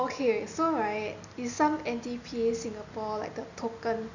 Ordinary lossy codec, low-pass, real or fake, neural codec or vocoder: none; 7.2 kHz; real; none